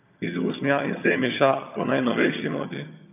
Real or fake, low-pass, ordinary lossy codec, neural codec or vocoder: fake; 3.6 kHz; none; vocoder, 22.05 kHz, 80 mel bands, HiFi-GAN